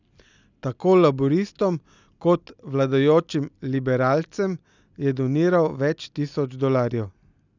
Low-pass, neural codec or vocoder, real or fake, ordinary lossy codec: 7.2 kHz; none; real; none